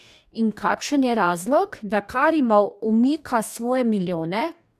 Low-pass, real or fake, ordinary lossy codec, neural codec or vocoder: 14.4 kHz; fake; none; codec, 44.1 kHz, 2.6 kbps, DAC